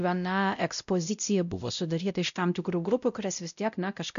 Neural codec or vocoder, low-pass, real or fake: codec, 16 kHz, 0.5 kbps, X-Codec, WavLM features, trained on Multilingual LibriSpeech; 7.2 kHz; fake